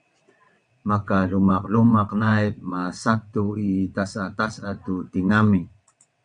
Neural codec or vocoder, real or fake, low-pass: vocoder, 22.05 kHz, 80 mel bands, WaveNeXt; fake; 9.9 kHz